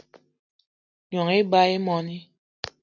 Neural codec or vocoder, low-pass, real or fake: none; 7.2 kHz; real